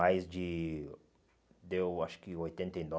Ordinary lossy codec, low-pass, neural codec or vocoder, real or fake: none; none; none; real